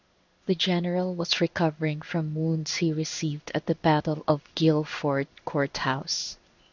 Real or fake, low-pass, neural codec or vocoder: fake; 7.2 kHz; codec, 16 kHz in and 24 kHz out, 1 kbps, XY-Tokenizer